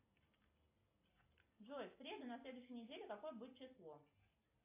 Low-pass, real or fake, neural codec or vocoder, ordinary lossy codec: 3.6 kHz; real; none; AAC, 32 kbps